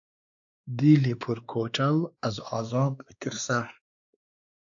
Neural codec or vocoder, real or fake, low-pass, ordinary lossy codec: codec, 16 kHz, 2 kbps, X-Codec, WavLM features, trained on Multilingual LibriSpeech; fake; 7.2 kHz; AAC, 64 kbps